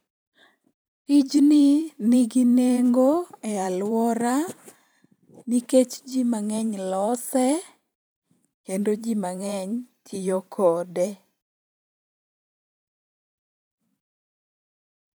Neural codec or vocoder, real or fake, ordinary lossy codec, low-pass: vocoder, 44.1 kHz, 128 mel bands every 512 samples, BigVGAN v2; fake; none; none